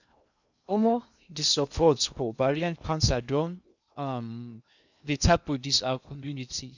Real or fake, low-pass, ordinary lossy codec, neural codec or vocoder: fake; 7.2 kHz; none; codec, 16 kHz in and 24 kHz out, 0.6 kbps, FocalCodec, streaming, 2048 codes